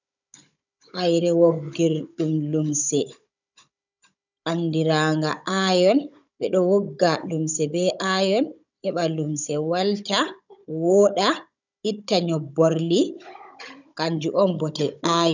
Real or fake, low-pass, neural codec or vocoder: fake; 7.2 kHz; codec, 16 kHz, 16 kbps, FunCodec, trained on Chinese and English, 50 frames a second